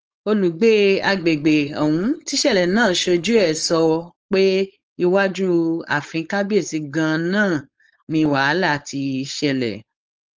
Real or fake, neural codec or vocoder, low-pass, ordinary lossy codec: fake; codec, 16 kHz, 4.8 kbps, FACodec; 7.2 kHz; Opus, 24 kbps